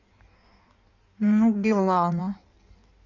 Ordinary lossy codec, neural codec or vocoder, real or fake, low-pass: none; codec, 16 kHz in and 24 kHz out, 1.1 kbps, FireRedTTS-2 codec; fake; 7.2 kHz